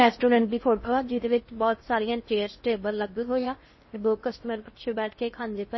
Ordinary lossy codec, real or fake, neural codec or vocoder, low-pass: MP3, 24 kbps; fake; codec, 16 kHz in and 24 kHz out, 0.6 kbps, FocalCodec, streaming, 4096 codes; 7.2 kHz